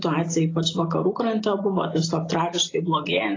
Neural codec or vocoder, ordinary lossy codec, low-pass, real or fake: none; AAC, 32 kbps; 7.2 kHz; real